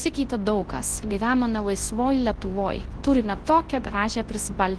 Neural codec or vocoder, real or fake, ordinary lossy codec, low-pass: codec, 24 kHz, 0.9 kbps, WavTokenizer, large speech release; fake; Opus, 16 kbps; 10.8 kHz